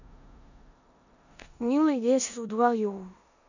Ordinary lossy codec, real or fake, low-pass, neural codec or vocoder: none; fake; 7.2 kHz; codec, 16 kHz in and 24 kHz out, 0.9 kbps, LongCat-Audio-Codec, four codebook decoder